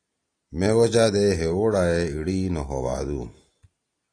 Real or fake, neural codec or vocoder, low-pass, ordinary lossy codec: real; none; 9.9 kHz; AAC, 48 kbps